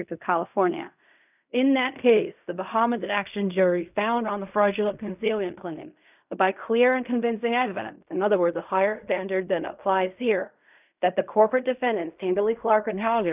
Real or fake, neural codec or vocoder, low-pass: fake; codec, 16 kHz in and 24 kHz out, 0.4 kbps, LongCat-Audio-Codec, fine tuned four codebook decoder; 3.6 kHz